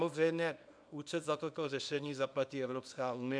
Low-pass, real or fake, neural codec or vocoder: 9.9 kHz; fake; codec, 24 kHz, 0.9 kbps, WavTokenizer, small release